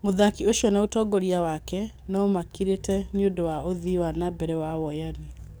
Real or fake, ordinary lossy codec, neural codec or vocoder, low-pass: fake; none; codec, 44.1 kHz, 7.8 kbps, DAC; none